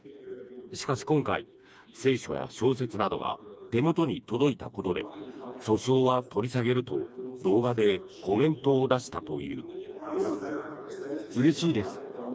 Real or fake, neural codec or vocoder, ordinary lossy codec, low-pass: fake; codec, 16 kHz, 2 kbps, FreqCodec, smaller model; none; none